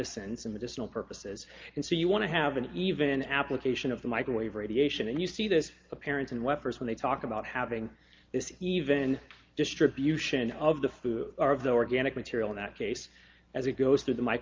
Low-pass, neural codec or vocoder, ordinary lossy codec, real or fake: 7.2 kHz; none; Opus, 24 kbps; real